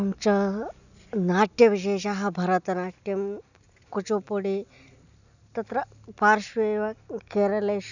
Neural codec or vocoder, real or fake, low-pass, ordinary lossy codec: none; real; 7.2 kHz; none